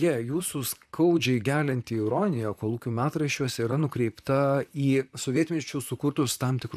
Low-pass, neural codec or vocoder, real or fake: 14.4 kHz; vocoder, 44.1 kHz, 128 mel bands, Pupu-Vocoder; fake